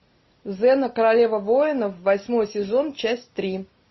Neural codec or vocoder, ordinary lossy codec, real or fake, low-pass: none; MP3, 24 kbps; real; 7.2 kHz